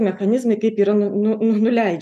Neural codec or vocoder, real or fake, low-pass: none; real; 14.4 kHz